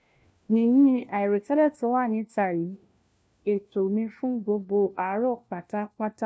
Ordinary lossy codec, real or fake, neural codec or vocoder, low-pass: none; fake; codec, 16 kHz, 1 kbps, FunCodec, trained on LibriTTS, 50 frames a second; none